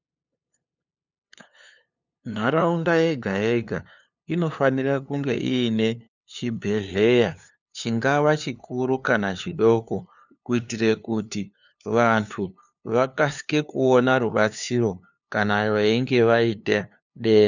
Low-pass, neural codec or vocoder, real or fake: 7.2 kHz; codec, 16 kHz, 2 kbps, FunCodec, trained on LibriTTS, 25 frames a second; fake